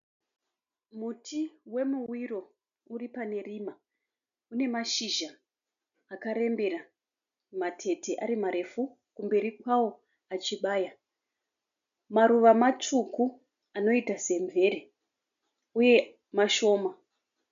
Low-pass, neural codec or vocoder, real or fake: 7.2 kHz; none; real